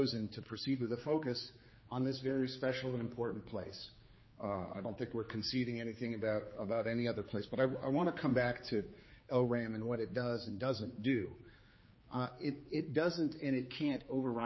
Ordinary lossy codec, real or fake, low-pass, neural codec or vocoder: MP3, 24 kbps; fake; 7.2 kHz; codec, 16 kHz, 4 kbps, X-Codec, HuBERT features, trained on general audio